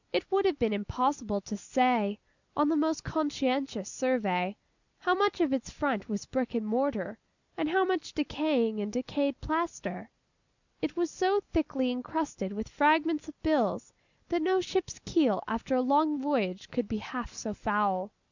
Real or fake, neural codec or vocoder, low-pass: real; none; 7.2 kHz